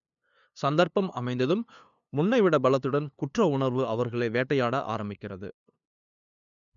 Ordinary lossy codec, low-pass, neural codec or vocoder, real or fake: none; 7.2 kHz; codec, 16 kHz, 2 kbps, FunCodec, trained on LibriTTS, 25 frames a second; fake